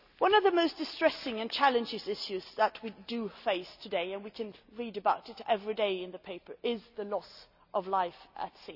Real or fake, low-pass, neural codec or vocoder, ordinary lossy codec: real; 5.4 kHz; none; none